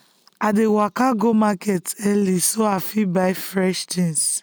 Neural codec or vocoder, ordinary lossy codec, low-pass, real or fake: none; none; none; real